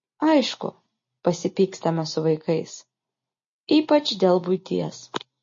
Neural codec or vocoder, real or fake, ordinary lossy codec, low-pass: none; real; MP3, 32 kbps; 7.2 kHz